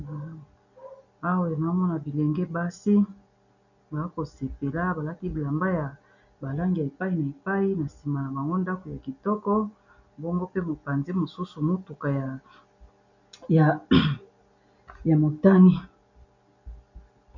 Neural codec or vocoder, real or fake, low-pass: none; real; 7.2 kHz